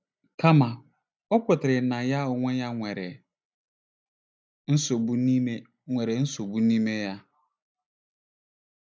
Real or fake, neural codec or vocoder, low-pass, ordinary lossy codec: real; none; none; none